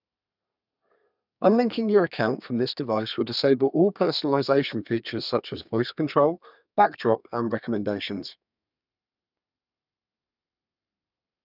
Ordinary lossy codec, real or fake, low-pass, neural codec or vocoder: none; fake; 5.4 kHz; codec, 32 kHz, 1.9 kbps, SNAC